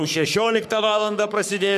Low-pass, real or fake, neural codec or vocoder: 14.4 kHz; fake; codec, 44.1 kHz, 3.4 kbps, Pupu-Codec